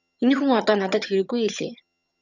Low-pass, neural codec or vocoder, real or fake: 7.2 kHz; vocoder, 22.05 kHz, 80 mel bands, HiFi-GAN; fake